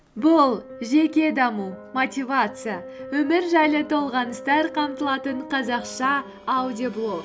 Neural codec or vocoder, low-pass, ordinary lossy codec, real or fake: none; none; none; real